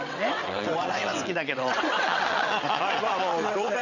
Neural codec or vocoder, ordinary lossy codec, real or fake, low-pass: vocoder, 22.05 kHz, 80 mel bands, WaveNeXt; none; fake; 7.2 kHz